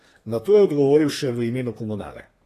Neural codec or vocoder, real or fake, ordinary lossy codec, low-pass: codec, 32 kHz, 1.9 kbps, SNAC; fake; AAC, 48 kbps; 14.4 kHz